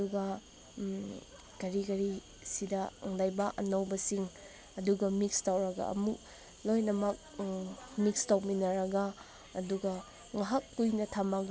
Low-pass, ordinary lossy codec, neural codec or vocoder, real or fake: none; none; none; real